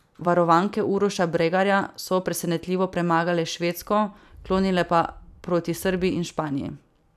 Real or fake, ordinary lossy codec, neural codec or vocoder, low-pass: fake; none; vocoder, 44.1 kHz, 128 mel bands every 256 samples, BigVGAN v2; 14.4 kHz